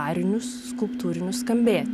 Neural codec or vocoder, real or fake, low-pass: none; real; 14.4 kHz